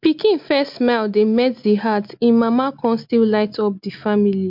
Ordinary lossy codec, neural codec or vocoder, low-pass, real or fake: MP3, 48 kbps; none; 5.4 kHz; real